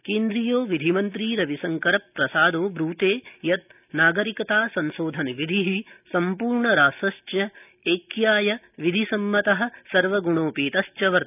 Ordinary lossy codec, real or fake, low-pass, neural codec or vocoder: none; real; 3.6 kHz; none